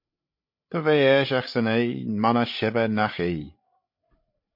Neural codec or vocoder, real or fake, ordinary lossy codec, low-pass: codec, 16 kHz, 16 kbps, FreqCodec, larger model; fake; MP3, 32 kbps; 5.4 kHz